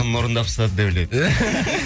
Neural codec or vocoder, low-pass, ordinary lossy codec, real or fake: none; none; none; real